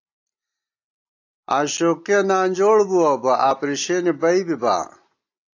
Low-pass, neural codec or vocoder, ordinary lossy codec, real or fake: 7.2 kHz; none; AAC, 48 kbps; real